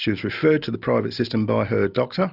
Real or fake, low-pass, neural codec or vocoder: real; 5.4 kHz; none